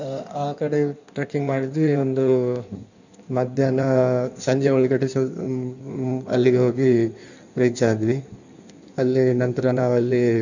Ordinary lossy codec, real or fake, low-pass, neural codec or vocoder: none; fake; 7.2 kHz; codec, 16 kHz in and 24 kHz out, 1.1 kbps, FireRedTTS-2 codec